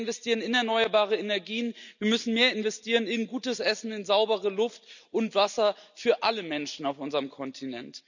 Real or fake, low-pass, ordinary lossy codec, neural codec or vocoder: real; 7.2 kHz; none; none